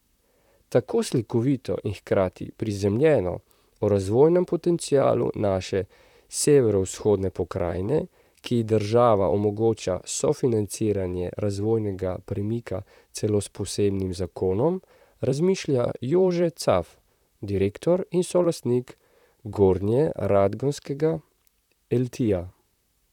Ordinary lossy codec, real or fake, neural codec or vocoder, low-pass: none; fake; vocoder, 44.1 kHz, 128 mel bands, Pupu-Vocoder; 19.8 kHz